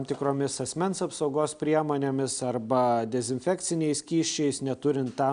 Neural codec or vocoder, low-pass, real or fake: none; 9.9 kHz; real